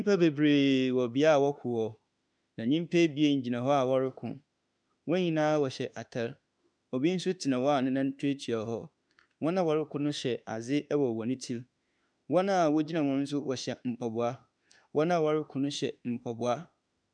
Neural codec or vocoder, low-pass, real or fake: autoencoder, 48 kHz, 32 numbers a frame, DAC-VAE, trained on Japanese speech; 9.9 kHz; fake